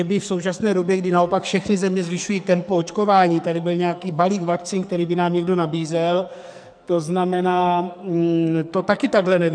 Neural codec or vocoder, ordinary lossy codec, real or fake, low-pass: codec, 44.1 kHz, 2.6 kbps, SNAC; MP3, 96 kbps; fake; 9.9 kHz